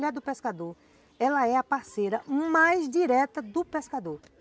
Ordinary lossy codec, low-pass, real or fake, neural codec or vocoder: none; none; real; none